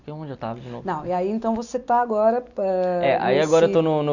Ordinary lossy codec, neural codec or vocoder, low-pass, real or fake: none; none; 7.2 kHz; real